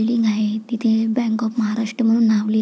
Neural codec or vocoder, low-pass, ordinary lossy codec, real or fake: none; none; none; real